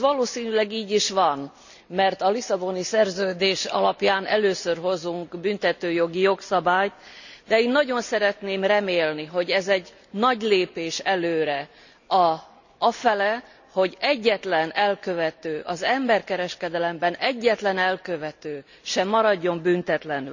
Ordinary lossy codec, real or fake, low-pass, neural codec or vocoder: none; real; 7.2 kHz; none